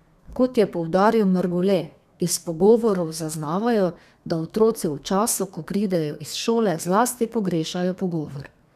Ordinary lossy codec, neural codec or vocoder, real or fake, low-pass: none; codec, 32 kHz, 1.9 kbps, SNAC; fake; 14.4 kHz